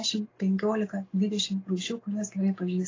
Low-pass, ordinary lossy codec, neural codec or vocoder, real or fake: 7.2 kHz; AAC, 32 kbps; none; real